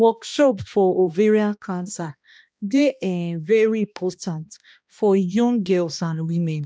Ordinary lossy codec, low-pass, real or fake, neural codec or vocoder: none; none; fake; codec, 16 kHz, 1 kbps, X-Codec, HuBERT features, trained on balanced general audio